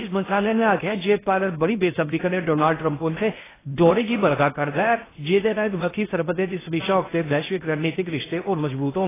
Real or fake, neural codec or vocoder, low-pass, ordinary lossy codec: fake; codec, 16 kHz in and 24 kHz out, 0.6 kbps, FocalCodec, streaming, 4096 codes; 3.6 kHz; AAC, 16 kbps